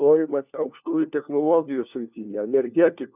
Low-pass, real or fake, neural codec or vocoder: 3.6 kHz; fake; codec, 16 kHz, 1 kbps, FunCodec, trained on LibriTTS, 50 frames a second